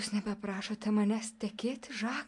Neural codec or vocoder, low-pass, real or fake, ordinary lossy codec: none; 10.8 kHz; real; MP3, 64 kbps